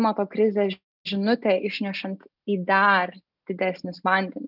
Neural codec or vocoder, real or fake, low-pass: none; real; 5.4 kHz